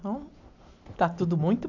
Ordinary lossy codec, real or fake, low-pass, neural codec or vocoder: none; real; 7.2 kHz; none